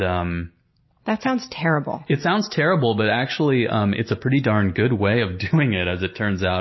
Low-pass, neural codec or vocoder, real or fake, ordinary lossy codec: 7.2 kHz; none; real; MP3, 24 kbps